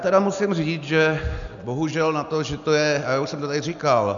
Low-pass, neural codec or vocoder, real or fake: 7.2 kHz; none; real